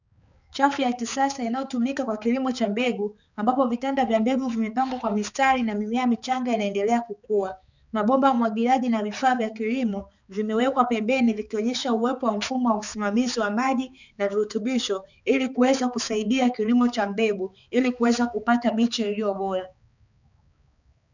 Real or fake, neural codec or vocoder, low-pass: fake; codec, 16 kHz, 4 kbps, X-Codec, HuBERT features, trained on balanced general audio; 7.2 kHz